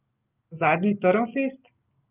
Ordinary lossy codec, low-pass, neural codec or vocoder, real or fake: Opus, 24 kbps; 3.6 kHz; none; real